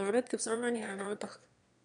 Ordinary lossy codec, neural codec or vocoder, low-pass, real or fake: none; autoencoder, 22.05 kHz, a latent of 192 numbers a frame, VITS, trained on one speaker; 9.9 kHz; fake